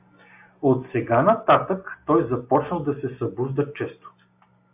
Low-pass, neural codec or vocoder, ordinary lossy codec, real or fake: 3.6 kHz; none; AAC, 32 kbps; real